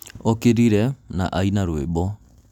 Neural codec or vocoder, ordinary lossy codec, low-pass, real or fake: none; none; 19.8 kHz; real